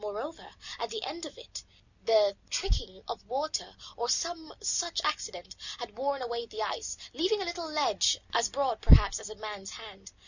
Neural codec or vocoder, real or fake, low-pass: none; real; 7.2 kHz